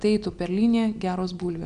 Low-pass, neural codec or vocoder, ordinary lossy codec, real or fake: 9.9 kHz; none; AAC, 96 kbps; real